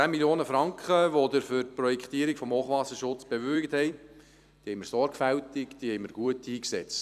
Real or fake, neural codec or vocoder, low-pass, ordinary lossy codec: fake; vocoder, 44.1 kHz, 128 mel bands every 256 samples, BigVGAN v2; 14.4 kHz; none